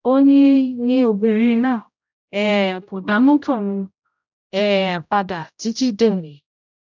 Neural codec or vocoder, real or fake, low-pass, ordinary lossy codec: codec, 16 kHz, 0.5 kbps, X-Codec, HuBERT features, trained on general audio; fake; 7.2 kHz; none